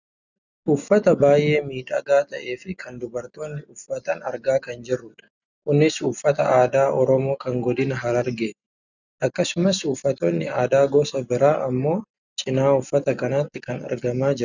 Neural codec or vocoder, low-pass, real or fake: none; 7.2 kHz; real